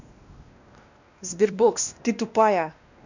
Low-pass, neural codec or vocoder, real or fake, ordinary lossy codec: 7.2 kHz; codec, 16 kHz, 1 kbps, X-Codec, WavLM features, trained on Multilingual LibriSpeech; fake; none